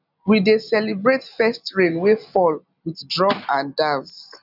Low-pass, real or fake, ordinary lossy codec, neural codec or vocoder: 5.4 kHz; real; none; none